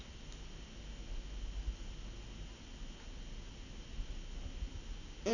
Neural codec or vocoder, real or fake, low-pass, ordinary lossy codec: none; real; 7.2 kHz; none